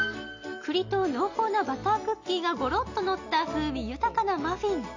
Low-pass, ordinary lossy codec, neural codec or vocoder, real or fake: 7.2 kHz; AAC, 48 kbps; none; real